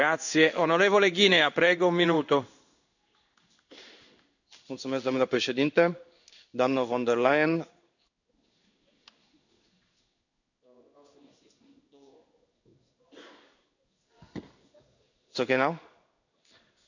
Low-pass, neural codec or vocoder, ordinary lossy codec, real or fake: 7.2 kHz; codec, 16 kHz in and 24 kHz out, 1 kbps, XY-Tokenizer; none; fake